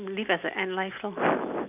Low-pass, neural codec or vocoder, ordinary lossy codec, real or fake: 3.6 kHz; none; none; real